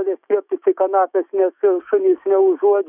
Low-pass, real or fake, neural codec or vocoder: 3.6 kHz; fake; autoencoder, 48 kHz, 128 numbers a frame, DAC-VAE, trained on Japanese speech